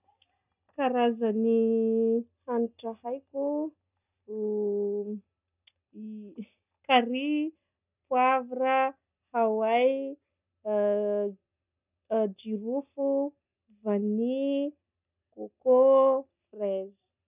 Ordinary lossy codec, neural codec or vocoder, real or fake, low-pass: none; none; real; 3.6 kHz